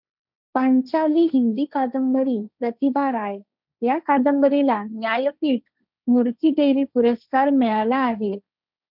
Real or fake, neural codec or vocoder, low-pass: fake; codec, 16 kHz, 1.1 kbps, Voila-Tokenizer; 5.4 kHz